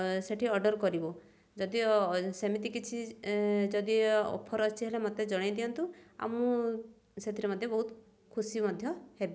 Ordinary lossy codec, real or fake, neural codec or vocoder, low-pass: none; real; none; none